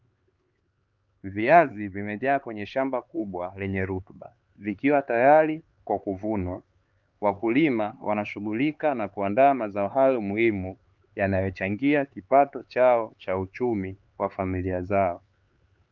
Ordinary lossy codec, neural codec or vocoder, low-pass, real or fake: Opus, 32 kbps; codec, 16 kHz, 4 kbps, X-Codec, HuBERT features, trained on LibriSpeech; 7.2 kHz; fake